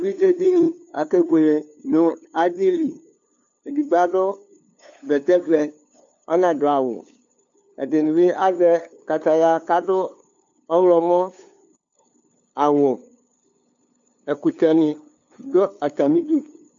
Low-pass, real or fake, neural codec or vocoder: 7.2 kHz; fake; codec, 16 kHz, 2 kbps, FunCodec, trained on LibriTTS, 25 frames a second